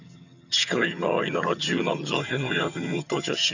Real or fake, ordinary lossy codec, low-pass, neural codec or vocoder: fake; none; 7.2 kHz; vocoder, 22.05 kHz, 80 mel bands, HiFi-GAN